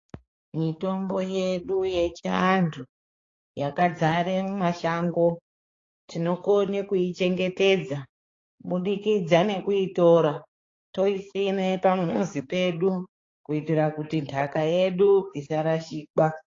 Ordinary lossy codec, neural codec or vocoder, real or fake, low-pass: AAC, 32 kbps; codec, 16 kHz, 4 kbps, X-Codec, HuBERT features, trained on balanced general audio; fake; 7.2 kHz